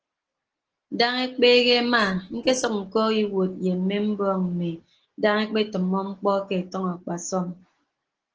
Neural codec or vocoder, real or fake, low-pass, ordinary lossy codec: none; real; 7.2 kHz; Opus, 16 kbps